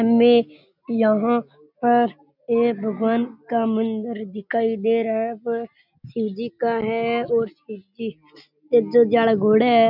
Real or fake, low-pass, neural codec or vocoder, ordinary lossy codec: real; 5.4 kHz; none; none